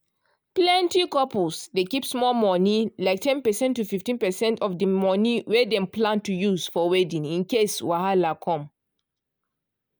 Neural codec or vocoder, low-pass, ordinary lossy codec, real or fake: none; none; none; real